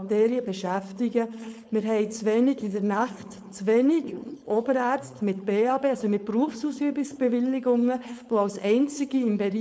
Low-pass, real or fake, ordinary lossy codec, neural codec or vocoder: none; fake; none; codec, 16 kHz, 4.8 kbps, FACodec